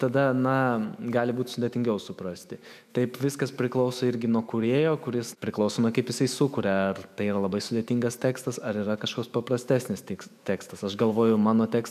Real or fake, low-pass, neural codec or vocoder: fake; 14.4 kHz; autoencoder, 48 kHz, 128 numbers a frame, DAC-VAE, trained on Japanese speech